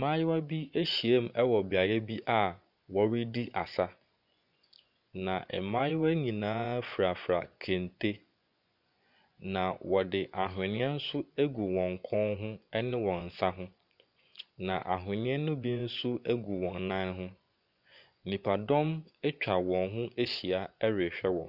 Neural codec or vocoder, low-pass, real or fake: vocoder, 24 kHz, 100 mel bands, Vocos; 5.4 kHz; fake